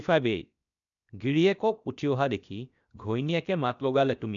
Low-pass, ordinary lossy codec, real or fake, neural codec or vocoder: 7.2 kHz; none; fake; codec, 16 kHz, 0.7 kbps, FocalCodec